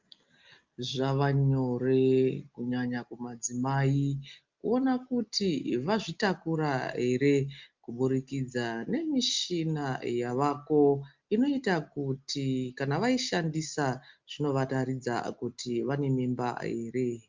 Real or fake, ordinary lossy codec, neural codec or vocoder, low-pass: real; Opus, 24 kbps; none; 7.2 kHz